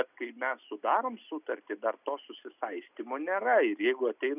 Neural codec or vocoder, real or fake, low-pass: none; real; 3.6 kHz